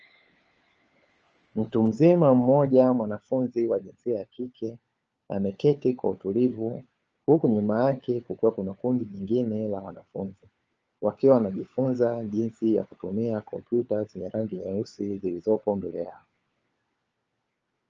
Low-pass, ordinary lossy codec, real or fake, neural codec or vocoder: 7.2 kHz; Opus, 24 kbps; fake; codec, 16 kHz, 4 kbps, FunCodec, trained on LibriTTS, 50 frames a second